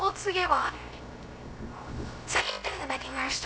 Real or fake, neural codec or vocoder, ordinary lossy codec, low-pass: fake; codec, 16 kHz, 0.3 kbps, FocalCodec; none; none